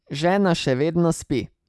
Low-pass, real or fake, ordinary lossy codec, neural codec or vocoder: none; real; none; none